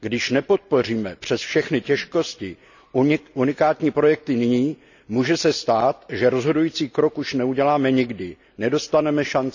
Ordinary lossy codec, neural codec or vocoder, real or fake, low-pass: none; none; real; 7.2 kHz